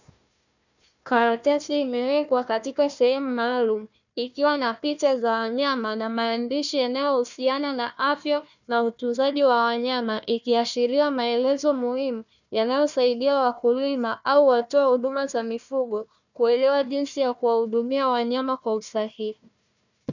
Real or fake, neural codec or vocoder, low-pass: fake; codec, 16 kHz, 1 kbps, FunCodec, trained on Chinese and English, 50 frames a second; 7.2 kHz